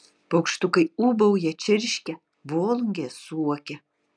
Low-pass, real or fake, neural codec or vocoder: 9.9 kHz; real; none